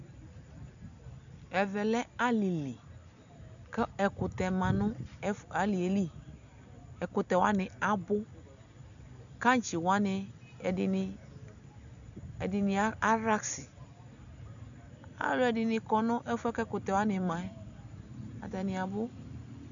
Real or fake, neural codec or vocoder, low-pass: real; none; 7.2 kHz